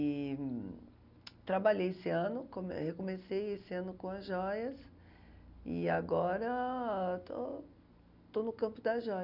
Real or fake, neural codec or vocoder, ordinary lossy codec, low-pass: real; none; none; 5.4 kHz